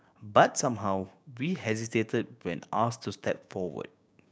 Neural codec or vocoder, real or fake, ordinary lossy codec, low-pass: codec, 16 kHz, 6 kbps, DAC; fake; none; none